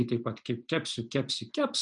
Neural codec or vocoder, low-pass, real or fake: none; 9.9 kHz; real